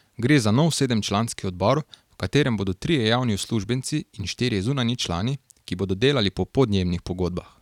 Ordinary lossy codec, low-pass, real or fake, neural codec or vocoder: none; 19.8 kHz; real; none